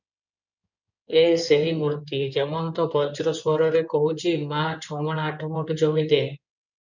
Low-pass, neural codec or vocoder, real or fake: 7.2 kHz; codec, 16 kHz in and 24 kHz out, 2.2 kbps, FireRedTTS-2 codec; fake